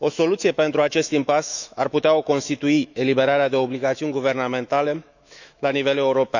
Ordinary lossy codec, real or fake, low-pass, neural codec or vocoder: none; fake; 7.2 kHz; autoencoder, 48 kHz, 128 numbers a frame, DAC-VAE, trained on Japanese speech